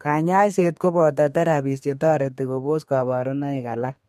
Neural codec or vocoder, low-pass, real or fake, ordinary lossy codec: codec, 32 kHz, 1.9 kbps, SNAC; 14.4 kHz; fake; MP3, 64 kbps